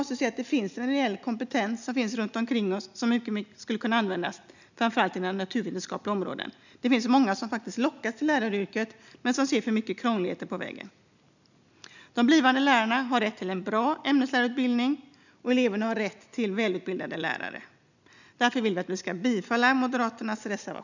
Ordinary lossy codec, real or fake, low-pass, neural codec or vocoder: none; real; 7.2 kHz; none